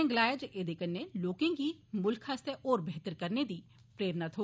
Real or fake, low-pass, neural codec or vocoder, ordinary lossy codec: real; none; none; none